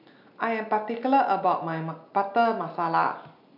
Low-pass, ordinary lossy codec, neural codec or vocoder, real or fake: 5.4 kHz; none; none; real